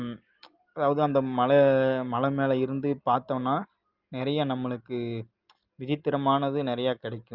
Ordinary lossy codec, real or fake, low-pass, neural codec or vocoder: Opus, 32 kbps; real; 5.4 kHz; none